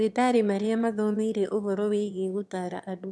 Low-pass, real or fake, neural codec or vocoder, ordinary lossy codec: none; fake; autoencoder, 22.05 kHz, a latent of 192 numbers a frame, VITS, trained on one speaker; none